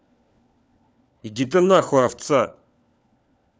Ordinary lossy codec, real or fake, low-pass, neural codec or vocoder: none; fake; none; codec, 16 kHz, 4 kbps, FunCodec, trained on LibriTTS, 50 frames a second